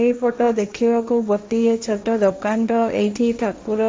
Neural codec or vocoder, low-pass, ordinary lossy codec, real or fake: codec, 16 kHz, 1.1 kbps, Voila-Tokenizer; none; none; fake